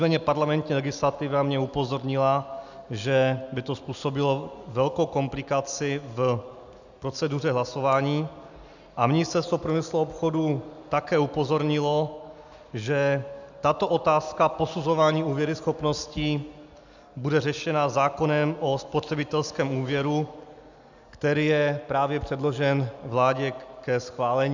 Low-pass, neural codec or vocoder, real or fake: 7.2 kHz; none; real